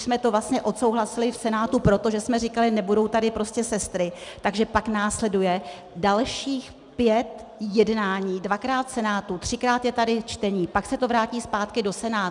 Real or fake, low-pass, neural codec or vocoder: real; 10.8 kHz; none